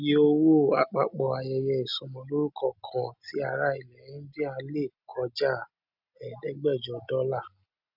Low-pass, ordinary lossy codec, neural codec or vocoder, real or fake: 5.4 kHz; none; none; real